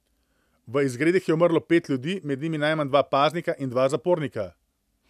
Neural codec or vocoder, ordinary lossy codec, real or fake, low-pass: none; none; real; 14.4 kHz